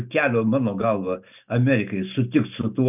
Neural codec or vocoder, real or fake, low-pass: none; real; 3.6 kHz